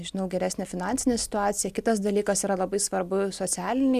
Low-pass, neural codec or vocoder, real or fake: 14.4 kHz; none; real